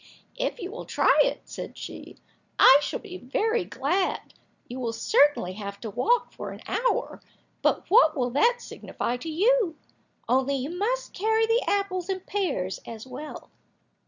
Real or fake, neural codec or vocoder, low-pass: real; none; 7.2 kHz